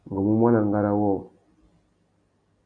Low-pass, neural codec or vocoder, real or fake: 9.9 kHz; none; real